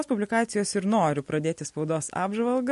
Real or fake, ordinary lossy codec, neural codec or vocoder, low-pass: real; MP3, 48 kbps; none; 14.4 kHz